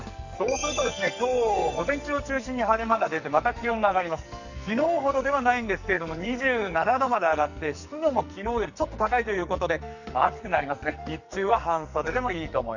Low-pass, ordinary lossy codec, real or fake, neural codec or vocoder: 7.2 kHz; none; fake; codec, 44.1 kHz, 2.6 kbps, SNAC